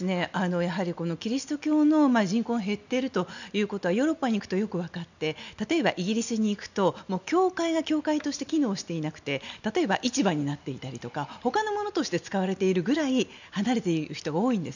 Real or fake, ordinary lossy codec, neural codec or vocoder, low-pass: real; none; none; 7.2 kHz